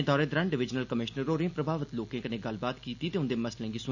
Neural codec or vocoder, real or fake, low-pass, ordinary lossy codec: none; real; 7.2 kHz; none